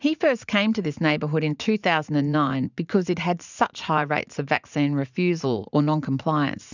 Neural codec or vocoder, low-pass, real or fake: vocoder, 44.1 kHz, 80 mel bands, Vocos; 7.2 kHz; fake